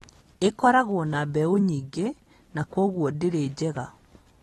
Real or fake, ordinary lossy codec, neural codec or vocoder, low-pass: real; AAC, 32 kbps; none; 19.8 kHz